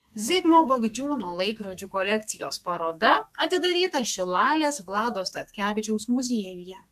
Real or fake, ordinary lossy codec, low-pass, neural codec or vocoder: fake; MP3, 96 kbps; 14.4 kHz; codec, 32 kHz, 1.9 kbps, SNAC